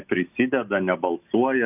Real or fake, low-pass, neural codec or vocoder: real; 3.6 kHz; none